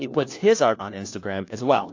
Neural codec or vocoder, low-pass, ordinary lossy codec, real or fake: codec, 16 kHz, 1 kbps, FunCodec, trained on LibriTTS, 50 frames a second; 7.2 kHz; AAC, 48 kbps; fake